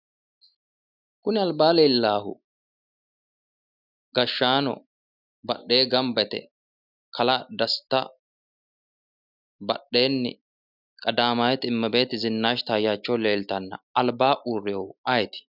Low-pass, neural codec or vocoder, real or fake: 5.4 kHz; none; real